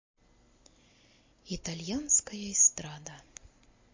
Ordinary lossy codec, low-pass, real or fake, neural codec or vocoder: MP3, 32 kbps; 7.2 kHz; real; none